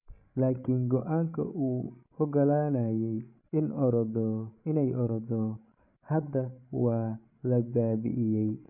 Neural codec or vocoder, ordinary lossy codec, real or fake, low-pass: codec, 16 kHz, 16 kbps, FreqCodec, larger model; none; fake; 3.6 kHz